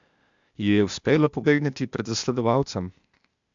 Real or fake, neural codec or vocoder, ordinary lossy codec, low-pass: fake; codec, 16 kHz, 0.8 kbps, ZipCodec; MP3, 64 kbps; 7.2 kHz